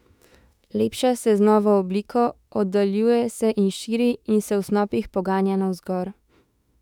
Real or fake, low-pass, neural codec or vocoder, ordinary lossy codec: fake; 19.8 kHz; autoencoder, 48 kHz, 32 numbers a frame, DAC-VAE, trained on Japanese speech; none